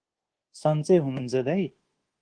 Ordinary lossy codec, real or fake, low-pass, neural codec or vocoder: Opus, 16 kbps; fake; 9.9 kHz; codec, 24 kHz, 1.2 kbps, DualCodec